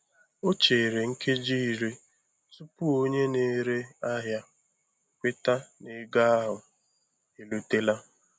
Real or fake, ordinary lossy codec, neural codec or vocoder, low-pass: real; none; none; none